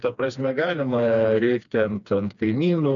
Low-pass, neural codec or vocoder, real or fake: 7.2 kHz; codec, 16 kHz, 2 kbps, FreqCodec, smaller model; fake